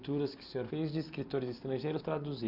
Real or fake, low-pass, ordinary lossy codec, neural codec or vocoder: real; 5.4 kHz; none; none